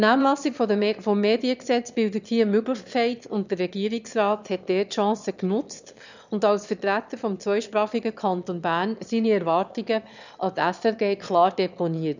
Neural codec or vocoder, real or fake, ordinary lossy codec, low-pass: autoencoder, 22.05 kHz, a latent of 192 numbers a frame, VITS, trained on one speaker; fake; none; 7.2 kHz